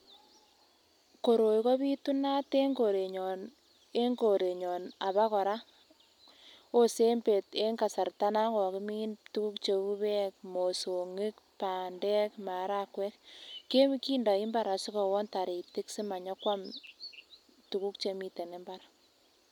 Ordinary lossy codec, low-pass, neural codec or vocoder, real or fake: none; 19.8 kHz; none; real